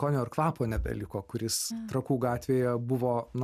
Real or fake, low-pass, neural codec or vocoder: real; 14.4 kHz; none